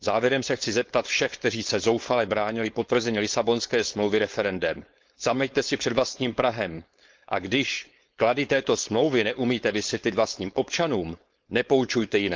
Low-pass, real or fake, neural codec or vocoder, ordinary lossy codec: 7.2 kHz; fake; codec, 16 kHz, 4.8 kbps, FACodec; Opus, 32 kbps